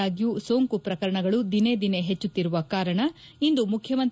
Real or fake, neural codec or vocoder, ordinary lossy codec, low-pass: real; none; none; none